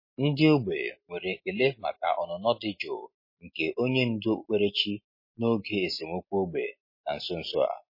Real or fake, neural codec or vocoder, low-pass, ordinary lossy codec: real; none; 5.4 kHz; MP3, 24 kbps